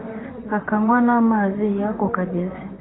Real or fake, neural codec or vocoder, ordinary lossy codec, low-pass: fake; vocoder, 44.1 kHz, 128 mel bands, Pupu-Vocoder; AAC, 16 kbps; 7.2 kHz